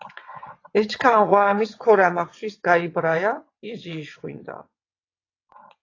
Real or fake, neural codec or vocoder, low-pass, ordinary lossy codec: fake; vocoder, 22.05 kHz, 80 mel bands, WaveNeXt; 7.2 kHz; AAC, 32 kbps